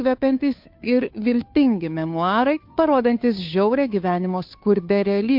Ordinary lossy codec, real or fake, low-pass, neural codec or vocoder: MP3, 48 kbps; fake; 5.4 kHz; codec, 16 kHz, 2 kbps, FunCodec, trained on Chinese and English, 25 frames a second